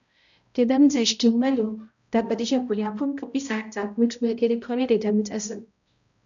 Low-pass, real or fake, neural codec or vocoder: 7.2 kHz; fake; codec, 16 kHz, 0.5 kbps, X-Codec, HuBERT features, trained on balanced general audio